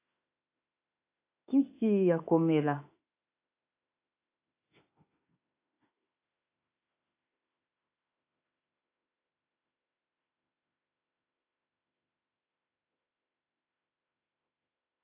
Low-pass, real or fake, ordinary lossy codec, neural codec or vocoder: 3.6 kHz; fake; AAC, 32 kbps; autoencoder, 48 kHz, 32 numbers a frame, DAC-VAE, trained on Japanese speech